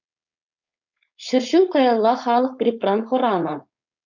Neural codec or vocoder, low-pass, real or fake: codec, 16 kHz, 4.8 kbps, FACodec; 7.2 kHz; fake